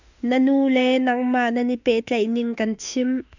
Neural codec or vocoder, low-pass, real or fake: autoencoder, 48 kHz, 32 numbers a frame, DAC-VAE, trained on Japanese speech; 7.2 kHz; fake